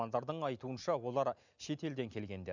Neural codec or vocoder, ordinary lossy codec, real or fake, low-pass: none; none; real; 7.2 kHz